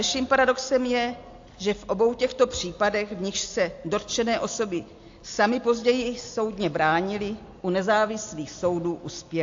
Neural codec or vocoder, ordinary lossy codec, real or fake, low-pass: none; AAC, 48 kbps; real; 7.2 kHz